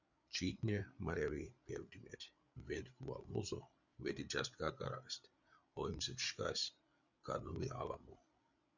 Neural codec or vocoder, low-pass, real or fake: codec, 16 kHz, 8 kbps, FreqCodec, larger model; 7.2 kHz; fake